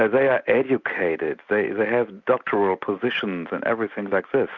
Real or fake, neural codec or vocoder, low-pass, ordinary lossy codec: real; none; 7.2 kHz; Opus, 64 kbps